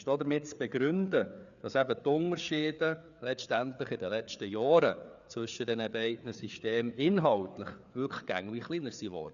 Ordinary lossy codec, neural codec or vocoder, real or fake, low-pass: none; codec, 16 kHz, 4 kbps, FreqCodec, larger model; fake; 7.2 kHz